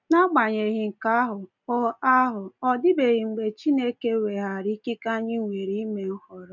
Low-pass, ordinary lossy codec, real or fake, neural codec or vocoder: 7.2 kHz; none; real; none